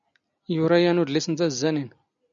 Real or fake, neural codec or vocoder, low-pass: real; none; 7.2 kHz